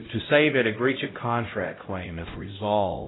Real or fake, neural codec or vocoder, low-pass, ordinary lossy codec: fake; codec, 16 kHz, 0.5 kbps, X-Codec, HuBERT features, trained on LibriSpeech; 7.2 kHz; AAC, 16 kbps